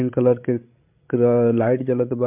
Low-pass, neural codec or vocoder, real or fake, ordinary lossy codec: 3.6 kHz; codec, 16 kHz, 16 kbps, FreqCodec, larger model; fake; MP3, 32 kbps